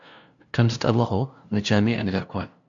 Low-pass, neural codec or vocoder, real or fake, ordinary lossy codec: 7.2 kHz; codec, 16 kHz, 0.5 kbps, FunCodec, trained on LibriTTS, 25 frames a second; fake; AAC, 64 kbps